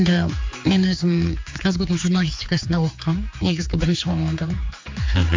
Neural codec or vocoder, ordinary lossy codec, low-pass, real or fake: codec, 16 kHz, 4 kbps, X-Codec, HuBERT features, trained on general audio; MP3, 48 kbps; 7.2 kHz; fake